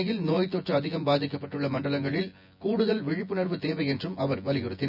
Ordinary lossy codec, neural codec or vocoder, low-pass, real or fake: none; vocoder, 24 kHz, 100 mel bands, Vocos; 5.4 kHz; fake